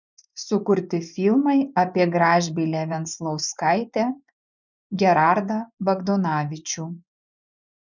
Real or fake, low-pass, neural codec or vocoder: fake; 7.2 kHz; vocoder, 24 kHz, 100 mel bands, Vocos